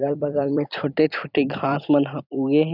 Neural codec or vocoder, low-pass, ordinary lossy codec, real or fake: vocoder, 44.1 kHz, 128 mel bands every 256 samples, BigVGAN v2; 5.4 kHz; none; fake